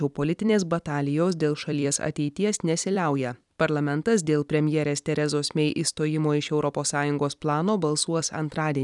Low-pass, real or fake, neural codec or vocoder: 10.8 kHz; fake; vocoder, 44.1 kHz, 128 mel bands every 256 samples, BigVGAN v2